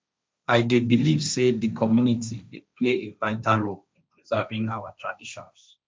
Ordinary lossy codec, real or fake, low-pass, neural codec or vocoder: none; fake; none; codec, 16 kHz, 1.1 kbps, Voila-Tokenizer